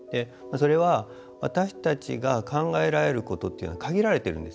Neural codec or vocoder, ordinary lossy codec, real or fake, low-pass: none; none; real; none